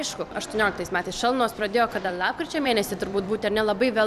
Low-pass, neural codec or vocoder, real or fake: 14.4 kHz; vocoder, 44.1 kHz, 128 mel bands every 256 samples, BigVGAN v2; fake